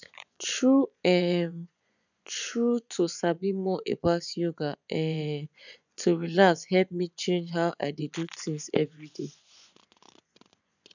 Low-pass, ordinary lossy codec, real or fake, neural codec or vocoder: 7.2 kHz; none; fake; vocoder, 24 kHz, 100 mel bands, Vocos